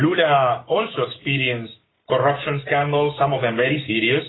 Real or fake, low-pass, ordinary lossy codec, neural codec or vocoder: fake; 7.2 kHz; AAC, 16 kbps; codec, 16 kHz, 6 kbps, DAC